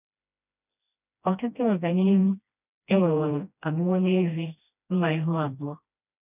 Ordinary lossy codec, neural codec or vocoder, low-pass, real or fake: none; codec, 16 kHz, 1 kbps, FreqCodec, smaller model; 3.6 kHz; fake